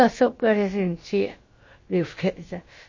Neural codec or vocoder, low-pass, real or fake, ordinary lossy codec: codec, 16 kHz, about 1 kbps, DyCAST, with the encoder's durations; 7.2 kHz; fake; MP3, 32 kbps